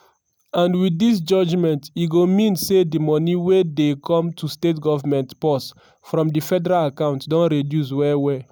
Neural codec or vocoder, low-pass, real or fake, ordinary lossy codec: none; none; real; none